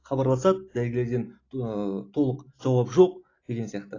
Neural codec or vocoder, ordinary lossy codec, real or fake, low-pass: none; AAC, 32 kbps; real; 7.2 kHz